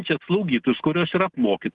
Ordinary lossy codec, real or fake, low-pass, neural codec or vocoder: Opus, 24 kbps; real; 10.8 kHz; none